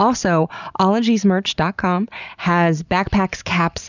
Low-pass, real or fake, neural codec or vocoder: 7.2 kHz; real; none